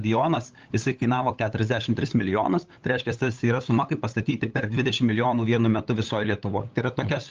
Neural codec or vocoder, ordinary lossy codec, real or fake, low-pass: codec, 16 kHz, 8 kbps, FunCodec, trained on LibriTTS, 25 frames a second; Opus, 24 kbps; fake; 7.2 kHz